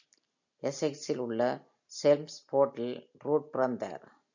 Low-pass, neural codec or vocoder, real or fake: 7.2 kHz; none; real